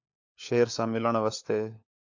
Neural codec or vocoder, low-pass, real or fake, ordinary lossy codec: codec, 16 kHz, 4 kbps, FunCodec, trained on LibriTTS, 50 frames a second; 7.2 kHz; fake; AAC, 48 kbps